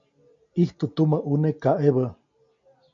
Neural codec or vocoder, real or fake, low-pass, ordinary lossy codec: none; real; 7.2 kHz; MP3, 48 kbps